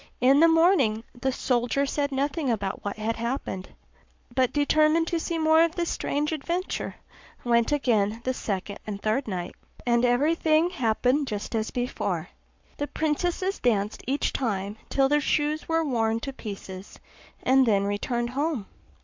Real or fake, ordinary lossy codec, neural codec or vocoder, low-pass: fake; MP3, 64 kbps; codec, 44.1 kHz, 7.8 kbps, Pupu-Codec; 7.2 kHz